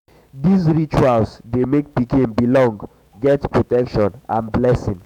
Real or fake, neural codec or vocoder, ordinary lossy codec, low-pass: fake; autoencoder, 48 kHz, 128 numbers a frame, DAC-VAE, trained on Japanese speech; none; 19.8 kHz